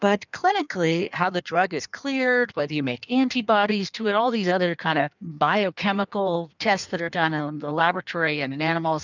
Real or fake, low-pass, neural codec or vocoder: fake; 7.2 kHz; codec, 16 kHz in and 24 kHz out, 1.1 kbps, FireRedTTS-2 codec